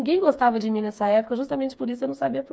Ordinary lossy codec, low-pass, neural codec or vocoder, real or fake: none; none; codec, 16 kHz, 4 kbps, FreqCodec, smaller model; fake